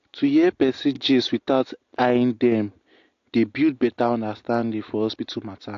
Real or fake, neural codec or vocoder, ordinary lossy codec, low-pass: real; none; AAC, 48 kbps; 7.2 kHz